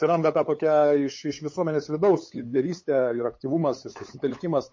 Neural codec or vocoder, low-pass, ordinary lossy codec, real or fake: codec, 16 kHz, 8 kbps, FunCodec, trained on LibriTTS, 25 frames a second; 7.2 kHz; MP3, 32 kbps; fake